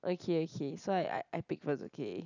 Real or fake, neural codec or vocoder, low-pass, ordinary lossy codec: real; none; 7.2 kHz; none